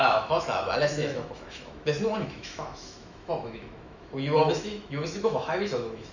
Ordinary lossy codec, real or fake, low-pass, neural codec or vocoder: none; fake; 7.2 kHz; autoencoder, 48 kHz, 128 numbers a frame, DAC-VAE, trained on Japanese speech